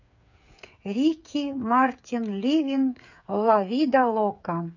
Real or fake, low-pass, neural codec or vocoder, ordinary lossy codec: fake; 7.2 kHz; codec, 16 kHz, 8 kbps, FreqCodec, smaller model; none